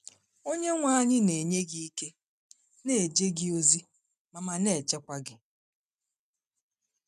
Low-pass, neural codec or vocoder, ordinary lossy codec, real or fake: 14.4 kHz; none; none; real